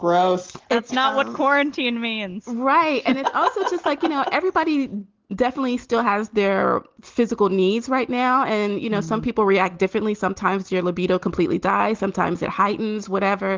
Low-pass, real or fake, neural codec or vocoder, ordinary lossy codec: 7.2 kHz; real; none; Opus, 16 kbps